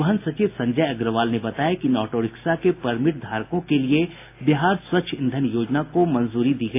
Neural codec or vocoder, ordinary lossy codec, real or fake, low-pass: none; AAC, 24 kbps; real; 3.6 kHz